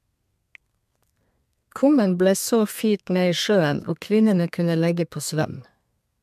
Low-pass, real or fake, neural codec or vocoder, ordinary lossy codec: 14.4 kHz; fake; codec, 32 kHz, 1.9 kbps, SNAC; MP3, 96 kbps